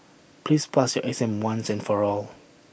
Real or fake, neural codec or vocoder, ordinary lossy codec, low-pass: real; none; none; none